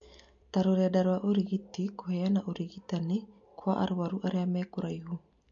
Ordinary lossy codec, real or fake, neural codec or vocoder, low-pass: MP3, 48 kbps; real; none; 7.2 kHz